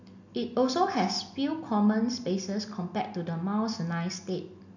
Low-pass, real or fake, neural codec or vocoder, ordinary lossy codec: 7.2 kHz; real; none; none